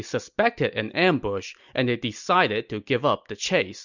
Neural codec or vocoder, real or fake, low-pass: none; real; 7.2 kHz